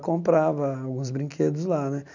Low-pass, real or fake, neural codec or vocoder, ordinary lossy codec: 7.2 kHz; real; none; none